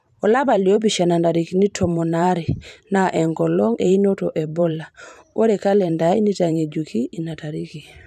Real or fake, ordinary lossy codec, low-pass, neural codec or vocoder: fake; none; 14.4 kHz; vocoder, 44.1 kHz, 128 mel bands every 512 samples, BigVGAN v2